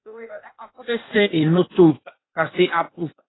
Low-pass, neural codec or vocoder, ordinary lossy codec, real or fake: 7.2 kHz; codec, 16 kHz, 0.8 kbps, ZipCodec; AAC, 16 kbps; fake